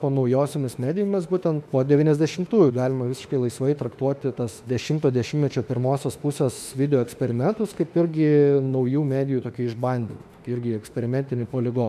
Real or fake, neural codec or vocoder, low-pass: fake; autoencoder, 48 kHz, 32 numbers a frame, DAC-VAE, trained on Japanese speech; 14.4 kHz